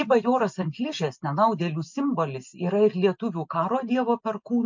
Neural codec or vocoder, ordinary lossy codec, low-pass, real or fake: none; MP3, 64 kbps; 7.2 kHz; real